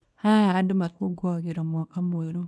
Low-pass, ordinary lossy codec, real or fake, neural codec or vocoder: none; none; fake; codec, 24 kHz, 0.9 kbps, WavTokenizer, small release